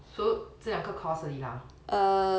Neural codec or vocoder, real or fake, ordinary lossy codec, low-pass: none; real; none; none